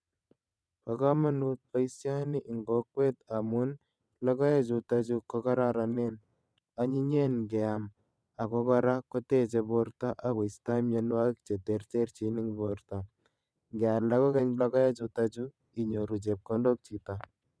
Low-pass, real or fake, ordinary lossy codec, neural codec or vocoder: none; fake; none; vocoder, 22.05 kHz, 80 mel bands, WaveNeXt